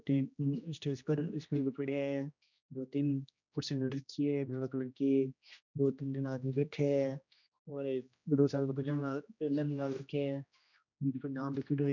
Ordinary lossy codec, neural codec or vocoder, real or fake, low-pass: none; codec, 16 kHz, 1 kbps, X-Codec, HuBERT features, trained on general audio; fake; 7.2 kHz